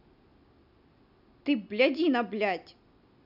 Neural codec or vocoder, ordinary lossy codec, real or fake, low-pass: none; none; real; 5.4 kHz